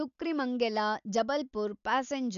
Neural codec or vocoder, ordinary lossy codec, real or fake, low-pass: none; none; real; 7.2 kHz